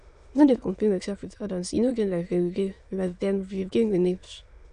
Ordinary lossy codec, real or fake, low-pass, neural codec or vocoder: none; fake; 9.9 kHz; autoencoder, 22.05 kHz, a latent of 192 numbers a frame, VITS, trained on many speakers